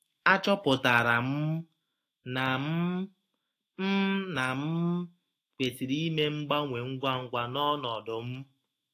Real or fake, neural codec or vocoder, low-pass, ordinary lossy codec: fake; autoencoder, 48 kHz, 128 numbers a frame, DAC-VAE, trained on Japanese speech; 14.4 kHz; AAC, 48 kbps